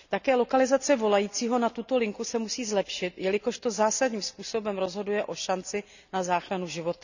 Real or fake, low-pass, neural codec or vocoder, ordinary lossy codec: real; 7.2 kHz; none; none